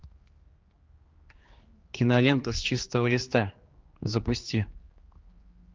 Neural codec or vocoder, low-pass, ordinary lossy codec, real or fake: codec, 16 kHz, 2 kbps, X-Codec, HuBERT features, trained on general audio; 7.2 kHz; Opus, 32 kbps; fake